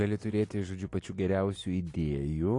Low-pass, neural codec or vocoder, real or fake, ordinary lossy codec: 10.8 kHz; none; real; AAC, 48 kbps